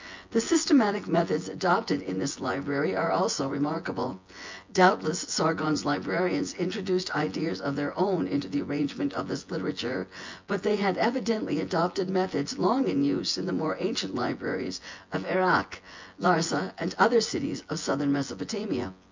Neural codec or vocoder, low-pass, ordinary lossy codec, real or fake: vocoder, 24 kHz, 100 mel bands, Vocos; 7.2 kHz; MP3, 64 kbps; fake